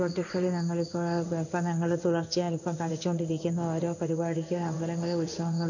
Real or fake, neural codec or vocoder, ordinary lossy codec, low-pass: fake; codec, 16 kHz in and 24 kHz out, 1 kbps, XY-Tokenizer; none; 7.2 kHz